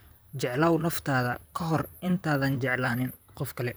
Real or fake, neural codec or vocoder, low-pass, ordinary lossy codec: fake; vocoder, 44.1 kHz, 128 mel bands, Pupu-Vocoder; none; none